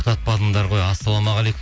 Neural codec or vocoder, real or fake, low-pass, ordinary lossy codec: none; real; none; none